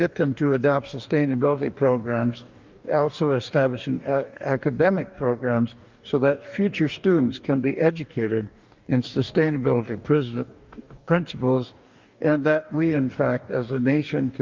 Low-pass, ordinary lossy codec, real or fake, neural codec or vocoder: 7.2 kHz; Opus, 24 kbps; fake; codec, 44.1 kHz, 2.6 kbps, DAC